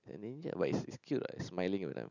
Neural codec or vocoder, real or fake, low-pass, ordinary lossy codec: none; real; 7.2 kHz; none